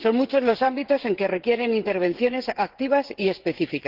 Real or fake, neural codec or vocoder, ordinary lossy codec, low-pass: fake; codec, 16 kHz, 16 kbps, FreqCodec, smaller model; Opus, 16 kbps; 5.4 kHz